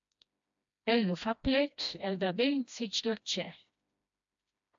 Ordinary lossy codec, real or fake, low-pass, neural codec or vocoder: AAC, 64 kbps; fake; 7.2 kHz; codec, 16 kHz, 1 kbps, FreqCodec, smaller model